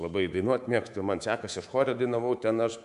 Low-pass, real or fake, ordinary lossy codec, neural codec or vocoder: 10.8 kHz; fake; AAC, 96 kbps; codec, 24 kHz, 3.1 kbps, DualCodec